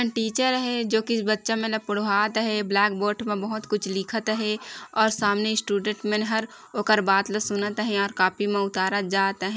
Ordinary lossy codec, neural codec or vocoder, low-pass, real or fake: none; none; none; real